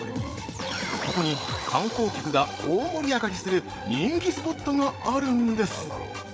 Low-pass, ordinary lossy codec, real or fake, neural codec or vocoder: none; none; fake; codec, 16 kHz, 8 kbps, FreqCodec, larger model